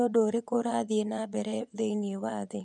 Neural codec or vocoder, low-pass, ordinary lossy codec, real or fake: vocoder, 24 kHz, 100 mel bands, Vocos; 10.8 kHz; none; fake